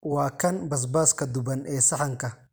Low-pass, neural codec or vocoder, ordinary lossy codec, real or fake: none; none; none; real